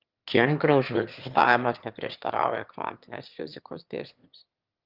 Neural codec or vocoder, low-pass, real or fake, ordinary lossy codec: autoencoder, 22.05 kHz, a latent of 192 numbers a frame, VITS, trained on one speaker; 5.4 kHz; fake; Opus, 32 kbps